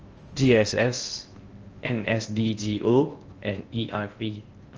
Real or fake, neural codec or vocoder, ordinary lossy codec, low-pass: fake; codec, 16 kHz in and 24 kHz out, 0.6 kbps, FocalCodec, streaming, 4096 codes; Opus, 24 kbps; 7.2 kHz